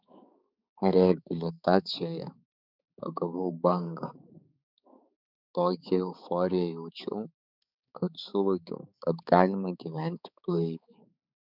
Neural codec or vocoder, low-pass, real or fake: codec, 16 kHz, 4 kbps, X-Codec, HuBERT features, trained on balanced general audio; 5.4 kHz; fake